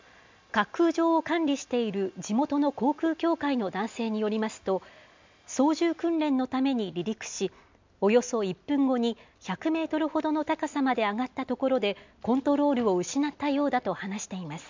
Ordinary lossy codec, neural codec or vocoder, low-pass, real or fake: MP3, 64 kbps; none; 7.2 kHz; real